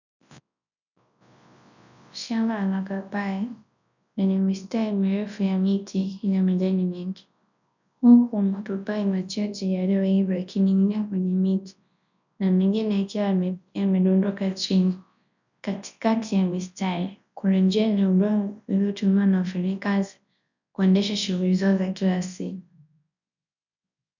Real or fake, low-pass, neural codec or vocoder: fake; 7.2 kHz; codec, 24 kHz, 0.9 kbps, WavTokenizer, large speech release